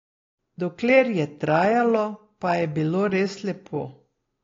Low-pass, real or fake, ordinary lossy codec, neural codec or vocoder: 7.2 kHz; real; AAC, 32 kbps; none